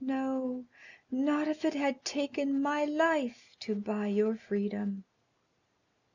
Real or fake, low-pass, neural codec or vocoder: real; 7.2 kHz; none